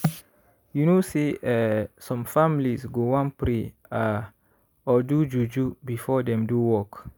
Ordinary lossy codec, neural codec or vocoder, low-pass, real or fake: none; none; none; real